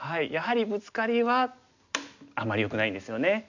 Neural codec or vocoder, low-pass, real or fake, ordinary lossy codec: none; 7.2 kHz; real; none